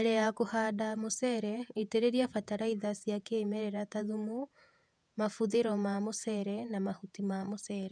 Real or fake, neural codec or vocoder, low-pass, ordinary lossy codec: fake; vocoder, 48 kHz, 128 mel bands, Vocos; 9.9 kHz; none